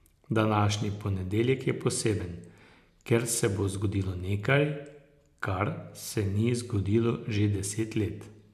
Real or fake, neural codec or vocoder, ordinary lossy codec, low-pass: real; none; MP3, 96 kbps; 14.4 kHz